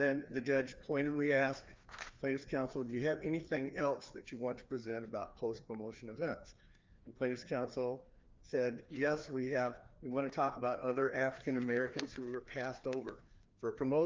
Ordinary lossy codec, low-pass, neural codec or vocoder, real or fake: Opus, 32 kbps; 7.2 kHz; codec, 16 kHz, 2 kbps, FreqCodec, larger model; fake